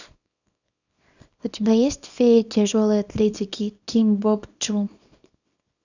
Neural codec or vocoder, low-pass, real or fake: codec, 24 kHz, 0.9 kbps, WavTokenizer, small release; 7.2 kHz; fake